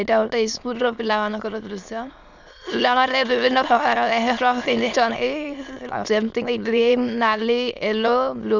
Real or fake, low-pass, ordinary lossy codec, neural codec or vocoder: fake; 7.2 kHz; none; autoencoder, 22.05 kHz, a latent of 192 numbers a frame, VITS, trained on many speakers